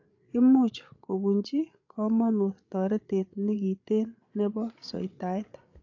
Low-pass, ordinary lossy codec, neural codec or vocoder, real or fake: 7.2 kHz; AAC, 48 kbps; codec, 16 kHz, 8 kbps, FreqCodec, larger model; fake